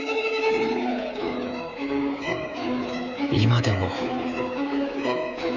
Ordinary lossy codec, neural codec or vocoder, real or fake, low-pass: none; codec, 24 kHz, 3.1 kbps, DualCodec; fake; 7.2 kHz